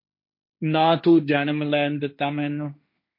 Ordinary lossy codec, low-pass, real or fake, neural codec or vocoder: MP3, 32 kbps; 5.4 kHz; fake; codec, 16 kHz, 1.1 kbps, Voila-Tokenizer